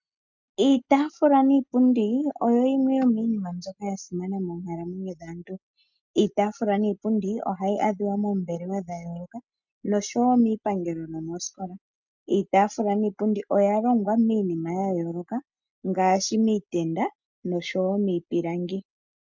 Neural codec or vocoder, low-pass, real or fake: none; 7.2 kHz; real